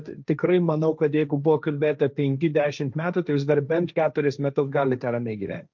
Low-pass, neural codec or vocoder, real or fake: 7.2 kHz; codec, 16 kHz, 1.1 kbps, Voila-Tokenizer; fake